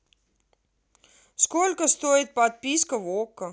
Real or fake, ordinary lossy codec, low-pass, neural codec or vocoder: real; none; none; none